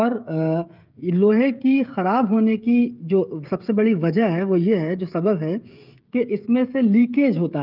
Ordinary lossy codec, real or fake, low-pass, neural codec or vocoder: Opus, 32 kbps; fake; 5.4 kHz; codec, 16 kHz, 16 kbps, FreqCodec, smaller model